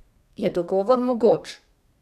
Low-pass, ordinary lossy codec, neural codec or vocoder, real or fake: 14.4 kHz; none; codec, 32 kHz, 1.9 kbps, SNAC; fake